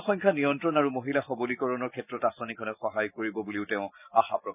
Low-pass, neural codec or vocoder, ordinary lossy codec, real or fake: 3.6 kHz; none; none; real